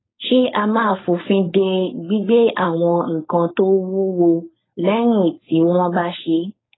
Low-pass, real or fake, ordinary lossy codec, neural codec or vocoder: 7.2 kHz; fake; AAC, 16 kbps; codec, 16 kHz, 4.8 kbps, FACodec